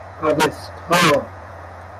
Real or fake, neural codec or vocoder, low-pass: fake; vocoder, 48 kHz, 128 mel bands, Vocos; 14.4 kHz